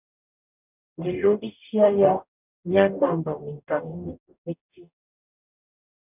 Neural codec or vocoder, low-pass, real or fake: codec, 44.1 kHz, 0.9 kbps, DAC; 3.6 kHz; fake